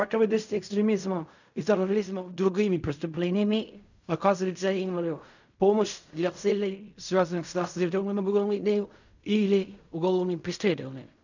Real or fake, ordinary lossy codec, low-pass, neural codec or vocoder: fake; none; 7.2 kHz; codec, 16 kHz in and 24 kHz out, 0.4 kbps, LongCat-Audio-Codec, fine tuned four codebook decoder